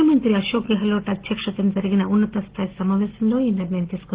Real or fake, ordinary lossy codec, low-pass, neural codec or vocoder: real; Opus, 16 kbps; 3.6 kHz; none